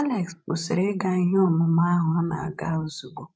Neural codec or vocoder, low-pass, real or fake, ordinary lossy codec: codec, 16 kHz, 16 kbps, FreqCodec, larger model; none; fake; none